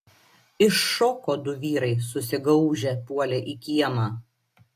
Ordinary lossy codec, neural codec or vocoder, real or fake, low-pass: AAC, 64 kbps; none; real; 14.4 kHz